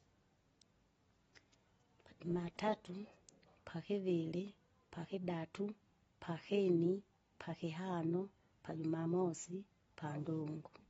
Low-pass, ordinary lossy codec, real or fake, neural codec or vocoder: 19.8 kHz; AAC, 24 kbps; real; none